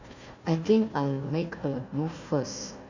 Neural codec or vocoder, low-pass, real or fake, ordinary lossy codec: codec, 16 kHz, 1 kbps, FunCodec, trained on Chinese and English, 50 frames a second; 7.2 kHz; fake; none